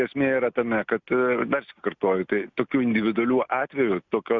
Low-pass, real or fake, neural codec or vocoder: 7.2 kHz; real; none